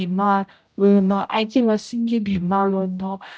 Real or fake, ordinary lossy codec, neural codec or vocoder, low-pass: fake; none; codec, 16 kHz, 0.5 kbps, X-Codec, HuBERT features, trained on general audio; none